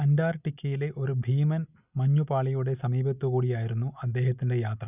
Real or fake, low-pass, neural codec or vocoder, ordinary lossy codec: real; 3.6 kHz; none; none